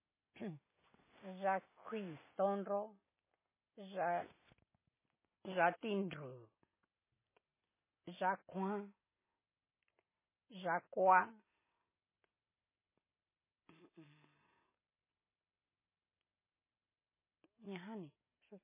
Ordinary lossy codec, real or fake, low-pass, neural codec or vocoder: MP3, 16 kbps; real; 3.6 kHz; none